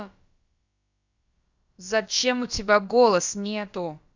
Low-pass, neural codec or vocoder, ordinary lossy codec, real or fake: 7.2 kHz; codec, 16 kHz, about 1 kbps, DyCAST, with the encoder's durations; Opus, 64 kbps; fake